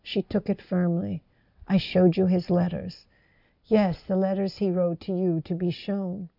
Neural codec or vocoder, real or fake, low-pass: none; real; 5.4 kHz